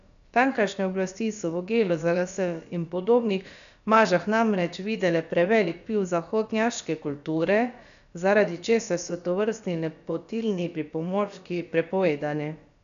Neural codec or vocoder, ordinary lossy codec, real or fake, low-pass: codec, 16 kHz, about 1 kbps, DyCAST, with the encoder's durations; none; fake; 7.2 kHz